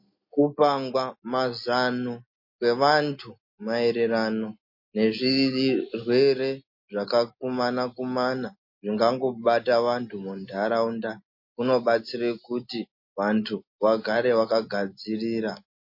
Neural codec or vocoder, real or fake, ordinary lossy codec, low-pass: none; real; MP3, 32 kbps; 5.4 kHz